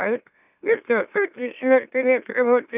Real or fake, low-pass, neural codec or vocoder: fake; 3.6 kHz; autoencoder, 44.1 kHz, a latent of 192 numbers a frame, MeloTTS